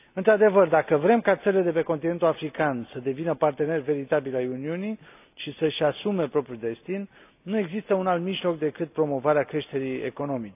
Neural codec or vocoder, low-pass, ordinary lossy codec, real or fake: none; 3.6 kHz; AAC, 32 kbps; real